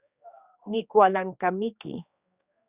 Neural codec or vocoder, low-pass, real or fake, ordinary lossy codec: codec, 16 kHz, 2 kbps, X-Codec, HuBERT features, trained on general audio; 3.6 kHz; fake; Opus, 64 kbps